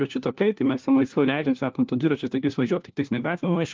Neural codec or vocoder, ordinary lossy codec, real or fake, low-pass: codec, 16 kHz, 1 kbps, FunCodec, trained on LibriTTS, 50 frames a second; Opus, 32 kbps; fake; 7.2 kHz